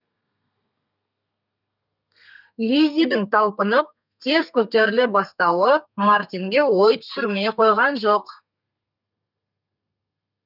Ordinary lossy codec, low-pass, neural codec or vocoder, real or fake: none; 5.4 kHz; codec, 32 kHz, 1.9 kbps, SNAC; fake